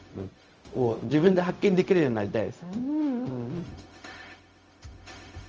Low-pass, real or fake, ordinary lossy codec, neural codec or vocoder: 7.2 kHz; fake; Opus, 24 kbps; codec, 16 kHz, 0.4 kbps, LongCat-Audio-Codec